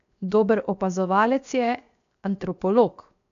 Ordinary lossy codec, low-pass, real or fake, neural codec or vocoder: none; 7.2 kHz; fake; codec, 16 kHz, 0.7 kbps, FocalCodec